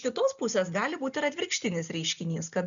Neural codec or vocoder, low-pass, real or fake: none; 7.2 kHz; real